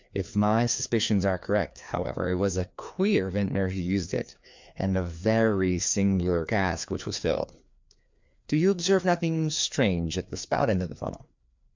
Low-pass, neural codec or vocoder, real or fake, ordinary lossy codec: 7.2 kHz; codec, 16 kHz, 2 kbps, FreqCodec, larger model; fake; MP3, 64 kbps